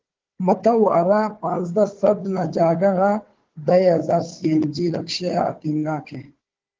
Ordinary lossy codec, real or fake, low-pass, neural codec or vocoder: Opus, 16 kbps; fake; 7.2 kHz; codec, 16 kHz, 4 kbps, FunCodec, trained on Chinese and English, 50 frames a second